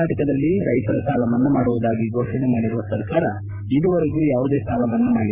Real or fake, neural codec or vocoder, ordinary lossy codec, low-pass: fake; codec, 16 kHz, 8 kbps, FreqCodec, larger model; none; 3.6 kHz